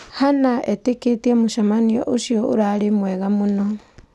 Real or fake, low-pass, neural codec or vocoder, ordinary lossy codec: real; none; none; none